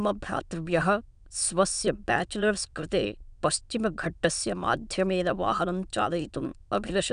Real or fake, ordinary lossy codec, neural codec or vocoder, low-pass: fake; none; autoencoder, 22.05 kHz, a latent of 192 numbers a frame, VITS, trained on many speakers; 9.9 kHz